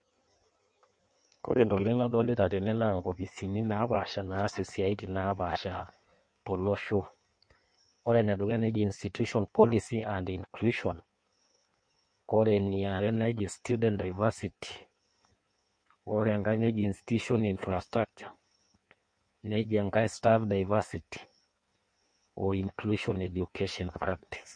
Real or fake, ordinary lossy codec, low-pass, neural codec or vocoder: fake; MP3, 48 kbps; 9.9 kHz; codec, 16 kHz in and 24 kHz out, 1.1 kbps, FireRedTTS-2 codec